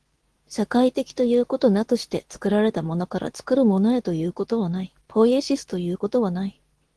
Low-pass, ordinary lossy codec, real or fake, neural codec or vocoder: 10.8 kHz; Opus, 16 kbps; fake; codec, 24 kHz, 0.9 kbps, WavTokenizer, medium speech release version 2